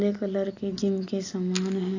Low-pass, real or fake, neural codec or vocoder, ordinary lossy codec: 7.2 kHz; real; none; AAC, 48 kbps